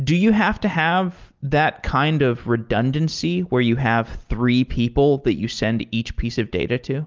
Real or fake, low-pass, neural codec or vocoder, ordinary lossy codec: real; 7.2 kHz; none; Opus, 32 kbps